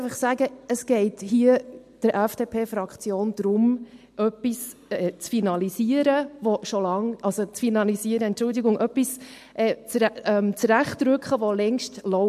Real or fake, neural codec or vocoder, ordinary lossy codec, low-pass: real; none; MP3, 64 kbps; 14.4 kHz